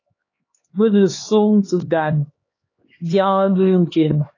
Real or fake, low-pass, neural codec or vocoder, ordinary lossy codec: fake; 7.2 kHz; codec, 16 kHz, 2 kbps, X-Codec, HuBERT features, trained on LibriSpeech; AAC, 32 kbps